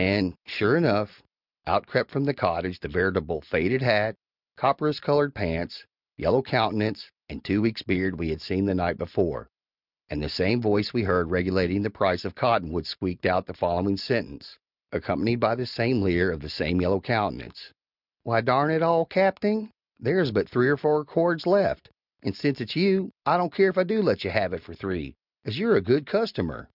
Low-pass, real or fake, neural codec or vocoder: 5.4 kHz; real; none